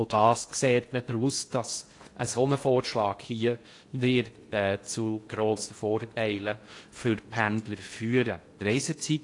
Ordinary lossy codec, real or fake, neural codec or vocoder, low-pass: AAC, 48 kbps; fake; codec, 16 kHz in and 24 kHz out, 0.6 kbps, FocalCodec, streaming, 2048 codes; 10.8 kHz